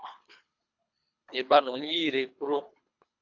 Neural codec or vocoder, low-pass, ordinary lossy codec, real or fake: codec, 24 kHz, 3 kbps, HILCodec; 7.2 kHz; AAC, 48 kbps; fake